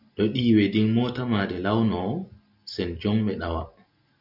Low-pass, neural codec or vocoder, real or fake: 5.4 kHz; none; real